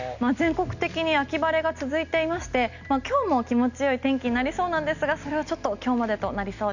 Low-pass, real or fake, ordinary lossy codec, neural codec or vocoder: 7.2 kHz; real; none; none